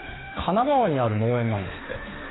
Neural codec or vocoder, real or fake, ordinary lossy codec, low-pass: autoencoder, 48 kHz, 32 numbers a frame, DAC-VAE, trained on Japanese speech; fake; AAC, 16 kbps; 7.2 kHz